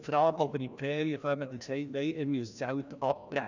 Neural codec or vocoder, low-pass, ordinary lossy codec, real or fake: codec, 16 kHz, 1 kbps, FreqCodec, larger model; 7.2 kHz; none; fake